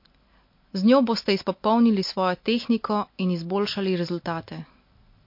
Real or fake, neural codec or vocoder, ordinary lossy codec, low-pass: real; none; MP3, 32 kbps; 5.4 kHz